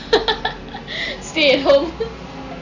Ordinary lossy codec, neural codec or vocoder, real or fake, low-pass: none; none; real; 7.2 kHz